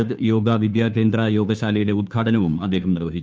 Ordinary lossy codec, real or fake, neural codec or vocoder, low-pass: none; fake; codec, 16 kHz, 2 kbps, FunCodec, trained on Chinese and English, 25 frames a second; none